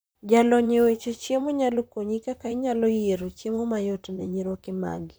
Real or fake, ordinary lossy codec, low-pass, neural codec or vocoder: fake; none; none; vocoder, 44.1 kHz, 128 mel bands, Pupu-Vocoder